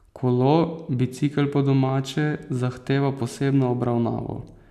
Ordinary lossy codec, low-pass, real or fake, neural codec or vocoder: none; 14.4 kHz; real; none